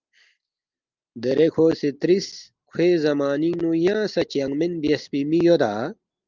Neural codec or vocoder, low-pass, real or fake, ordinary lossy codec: none; 7.2 kHz; real; Opus, 32 kbps